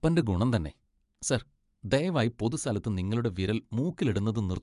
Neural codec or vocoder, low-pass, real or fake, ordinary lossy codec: vocoder, 24 kHz, 100 mel bands, Vocos; 10.8 kHz; fake; MP3, 96 kbps